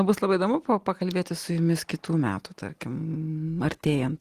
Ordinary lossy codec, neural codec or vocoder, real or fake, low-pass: Opus, 24 kbps; none; real; 14.4 kHz